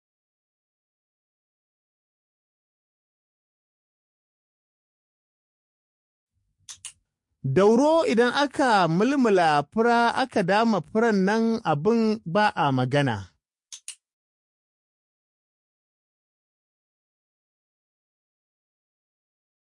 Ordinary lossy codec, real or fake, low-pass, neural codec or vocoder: MP3, 48 kbps; fake; 10.8 kHz; codec, 44.1 kHz, 7.8 kbps, DAC